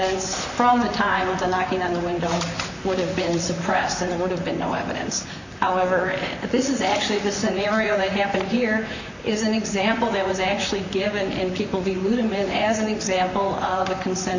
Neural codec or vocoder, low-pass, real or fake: vocoder, 44.1 kHz, 128 mel bands, Pupu-Vocoder; 7.2 kHz; fake